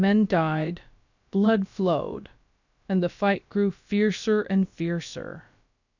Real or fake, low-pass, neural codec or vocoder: fake; 7.2 kHz; codec, 16 kHz, about 1 kbps, DyCAST, with the encoder's durations